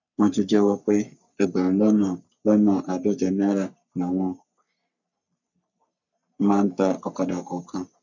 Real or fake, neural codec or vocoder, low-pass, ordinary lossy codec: fake; codec, 44.1 kHz, 3.4 kbps, Pupu-Codec; 7.2 kHz; none